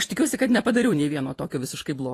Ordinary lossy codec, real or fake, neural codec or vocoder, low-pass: AAC, 48 kbps; fake; vocoder, 48 kHz, 128 mel bands, Vocos; 14.4 kHz